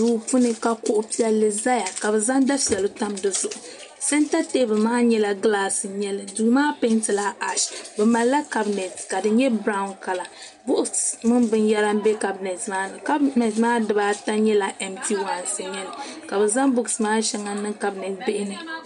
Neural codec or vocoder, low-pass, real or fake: none; 10.8 kHz; real